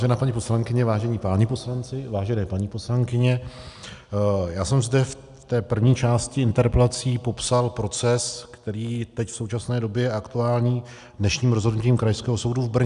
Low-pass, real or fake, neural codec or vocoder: 10.8 kHz; real; none